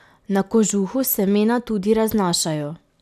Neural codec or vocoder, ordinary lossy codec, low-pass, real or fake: none; none; 14.4 kHz; real